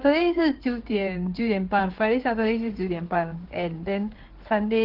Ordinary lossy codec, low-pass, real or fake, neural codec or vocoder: Opus, 16 kbps; 5.4 kHz; fake; codec, 16 kHz in and 24 kHz out, 2.2 kbps, FireRedTTS-2 codec